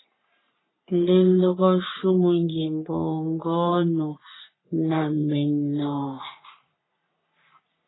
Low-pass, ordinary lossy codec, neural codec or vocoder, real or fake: 7.2 kHz; AAC, 16 kbps; codec, 44.1 kHz, 3.4 kbps, Pupu-Codec; fake